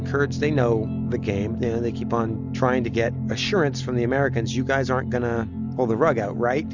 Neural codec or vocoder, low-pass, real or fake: none; 7.2 kHz; real